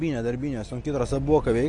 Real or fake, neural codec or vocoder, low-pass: real; none; 10.8 kHz